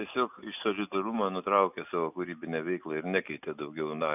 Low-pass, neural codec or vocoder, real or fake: 3.6 kHz; none; real